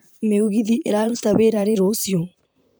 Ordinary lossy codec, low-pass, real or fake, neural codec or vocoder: none; none; fake; vocoder, 44.1 kHz, 128 mel bands, Pupu-Vocoder